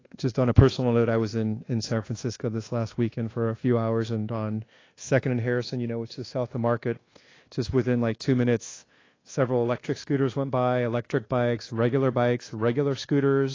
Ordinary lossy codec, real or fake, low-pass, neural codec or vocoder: AAC, 32 kbps; fake; 7.2 kHz; codec, 24 kHz, 1.2 kbps, DualCodec